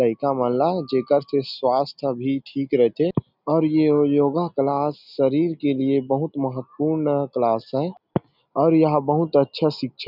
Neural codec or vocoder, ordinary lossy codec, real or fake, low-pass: none; none; real; 5.4 kHz